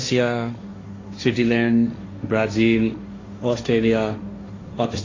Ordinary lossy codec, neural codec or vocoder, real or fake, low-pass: AAC, 32 kbps; codec, 16 kHz, 1.1 kbps, Voila-Tokenizer; fake; 7.2 kHz